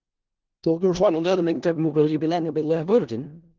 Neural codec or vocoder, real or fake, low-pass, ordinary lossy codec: codec, 16 kHz in and 24 kHz out, 0.4 kbps, LongCat-Audio-Codec, four codebook decoder; fake; 7.2 kHz; Opus, 16 kbps